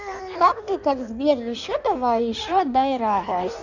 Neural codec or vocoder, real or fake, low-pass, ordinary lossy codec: codec, 16 kHz in and 24 kHz out, 1.1 kbps, FireRedTTS-2 codec; fake; 7.2 kHz; none